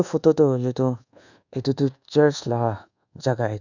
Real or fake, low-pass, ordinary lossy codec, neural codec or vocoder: fake; 7.2 kHz; none; codec, 24 kHz, 1.2 kbps, DualCodec